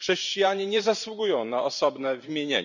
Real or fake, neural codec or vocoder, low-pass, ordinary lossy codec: fake; vocoder, 44.1 kHz, 128 mel bands every 512 samples, BigVGAN v2; 7.2 kHz; none